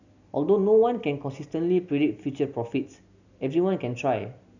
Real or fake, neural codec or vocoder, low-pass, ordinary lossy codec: real; none; 7.2 kHz; none